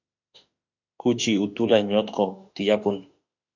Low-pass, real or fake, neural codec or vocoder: 7.2 kHz; fake; autoencoder, 48 kHz, 32 numbers a frame, DAC-VAE, trained on Japanese speech